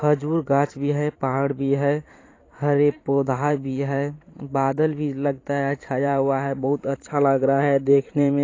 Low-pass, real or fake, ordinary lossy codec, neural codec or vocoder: 7.2 kHz; fake; AAC, 32 kbps; vocoder, 44.1 kHz, 128 mel bands every 512 samples, BigVGAN v2